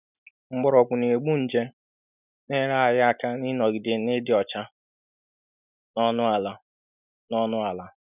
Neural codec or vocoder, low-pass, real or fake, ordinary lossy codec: none; 3.6 kHz; real; none